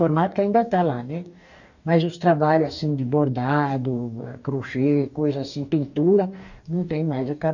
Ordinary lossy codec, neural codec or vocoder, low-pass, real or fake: none; codec, 44.1 kHz, 2.6 kbps, DAC; 7.2 kHz; fake